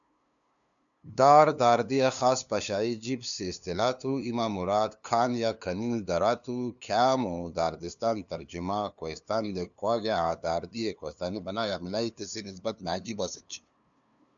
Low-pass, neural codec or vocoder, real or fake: 7.2 kHz; codec, 16 kHz, 2 kbps, FunCodec, trained on LibriTTS, 25 frames a second; fake